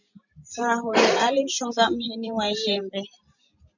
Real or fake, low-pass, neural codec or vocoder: fake; 7.2 kHz; vocoder, 44.1 kHz, 128 mel bands every 512 samples, BigVGAN v2